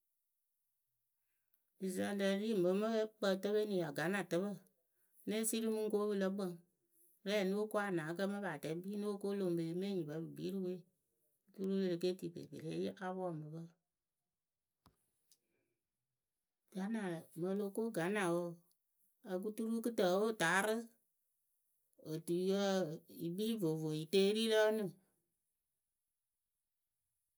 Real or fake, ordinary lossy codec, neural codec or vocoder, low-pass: real; none; none; none